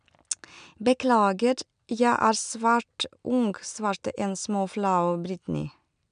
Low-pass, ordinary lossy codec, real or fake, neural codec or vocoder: 9.9 kHz; none; real; none